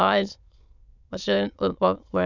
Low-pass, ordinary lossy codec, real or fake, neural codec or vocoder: 7.2 kHz; none; fake; autoencoder, 22.05 kHz, a latent of 192 numbers a frame, VITS, trained on many speakers